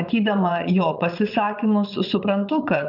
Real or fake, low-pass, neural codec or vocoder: fake; 5.4 kHz; vocoder, 22.05 kHz, 80 mel bands, Vocos